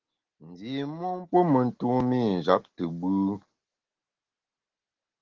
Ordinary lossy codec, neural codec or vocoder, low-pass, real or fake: Opus, 16 kbps; none; 7.2 kHz; real